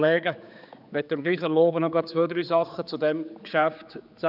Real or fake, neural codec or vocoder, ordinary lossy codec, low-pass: fake; codec, 16 kHz, 4 kbps, X-Codec, HuBERT features, trained on general audio; none; 5.4 kHz